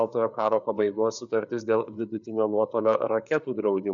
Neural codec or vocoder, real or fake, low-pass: codec, 16 kHz, 4 kbps, FreqCodec, larger model; fake; 7.2 kHz